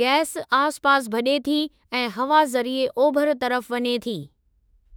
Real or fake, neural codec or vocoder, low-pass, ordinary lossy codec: fake; autoencoder, 48 kHz, 32 numbers a frame, DAC-VAE, trained on Japanese speech; none; none